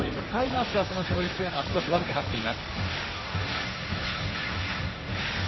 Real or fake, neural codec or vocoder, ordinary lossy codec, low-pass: fake; codec, 16 kHz, 1.1 kbps, Voila-Tokenizer; MP3, 24 kbps; 7.2 kHz